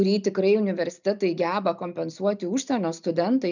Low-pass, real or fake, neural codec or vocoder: 7.2 kHz; real; none